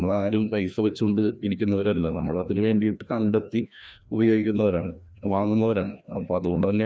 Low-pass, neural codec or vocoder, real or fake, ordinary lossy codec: none; codec, 16 kHz, 1 kbps, FreqCodec, larger model; fake; none